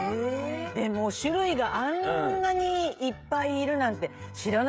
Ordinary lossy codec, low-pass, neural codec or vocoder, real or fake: none; none; codec, 16 kHz, 16 kbps, FreqCodec, smaller model; fake